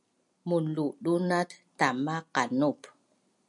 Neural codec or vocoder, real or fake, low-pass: none; real; 10.8 kHz